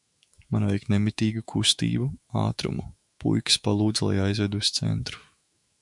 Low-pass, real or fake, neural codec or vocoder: 10.8 kHz; fake; autoencoder, 48 kHz, 128 numbers a frame, DAC-VAE, trained on Japanese speech